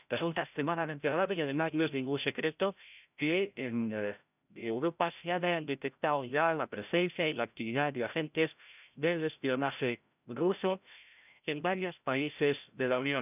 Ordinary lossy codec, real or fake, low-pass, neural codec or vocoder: none; fake; 3.6 kHz; codec, 16 kHz, 0.5 kbps, FreqCodec, larger model